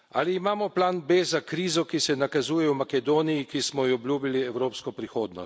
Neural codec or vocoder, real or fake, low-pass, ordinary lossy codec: none; real; none; none